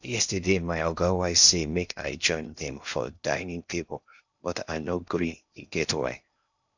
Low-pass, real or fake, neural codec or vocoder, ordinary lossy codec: 7.2 kHz; fake; codec, 16 kHz in and 24 kHz out, 0.6 kbps, FocalCodec, streaming, 2048 codes; none